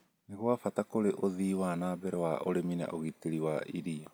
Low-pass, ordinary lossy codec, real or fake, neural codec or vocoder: none; none; real; none